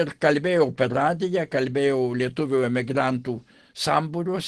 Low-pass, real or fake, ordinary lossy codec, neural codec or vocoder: 10.8 kHz; real; Opus, 16 kbps; none